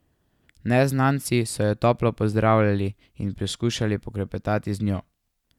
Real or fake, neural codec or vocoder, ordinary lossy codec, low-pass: real; none; none; 19.8 kHz